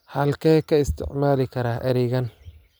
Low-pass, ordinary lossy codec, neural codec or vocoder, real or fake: none; none; none; real